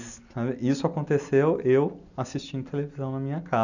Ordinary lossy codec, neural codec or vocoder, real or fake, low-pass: none; none; real; 7.2 kHz